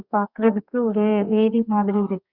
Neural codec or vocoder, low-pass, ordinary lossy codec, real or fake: codec, 32 kHz, 1.9 kbps, SNAC; 5.4 kHz; Opus, 64 kbps; fake